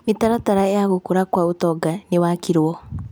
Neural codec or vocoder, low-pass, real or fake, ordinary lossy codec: none; none; real; none